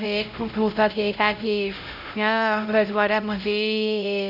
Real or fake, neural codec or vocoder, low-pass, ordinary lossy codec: fake; codec, 16 kHz, 0.5 kbps, X-Codec, HuBERT features, trained on LibriSpeech; 5.4 kHz; none